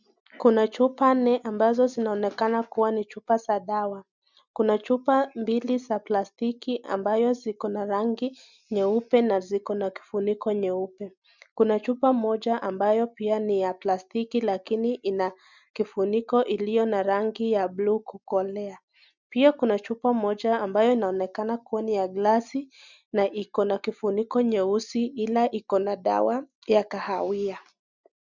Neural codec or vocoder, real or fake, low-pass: none; real; 7.2 kHz